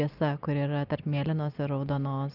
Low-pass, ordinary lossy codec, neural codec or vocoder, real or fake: 5.4 kHz; Opus, 24 kbps; none; real